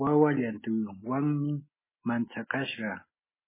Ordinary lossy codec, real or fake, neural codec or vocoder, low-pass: MP3, 16 kbps; real; none; 3.6 kHz